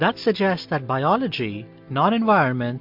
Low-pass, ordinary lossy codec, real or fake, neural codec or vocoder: 5.4 kHz; MP3, 48 kbps; real; none